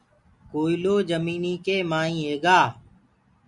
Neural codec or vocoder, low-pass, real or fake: none; 10.8 kHz; real